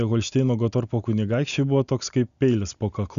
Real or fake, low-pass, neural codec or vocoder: real; 7.2 kHz; none